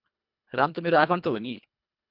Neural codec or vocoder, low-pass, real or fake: codec, 24 kHz, 1.5 kbps, HILCodec; 5.4 kHz; fake